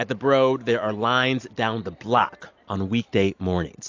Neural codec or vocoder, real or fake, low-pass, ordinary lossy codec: none; real; 7.2 kHz; AAC, 48 kbps